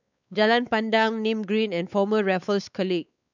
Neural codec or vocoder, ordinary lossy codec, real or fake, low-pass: codec, 16 kHz, 4 kbps, X-Codec, WavLM features, trained on Multilingual LibriSpeech; none; fake; 7.2 kHz